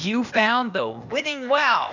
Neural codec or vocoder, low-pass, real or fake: codec, 16 kHz, 0.8 kbps, ZipCodec; 7.2 kHz; fake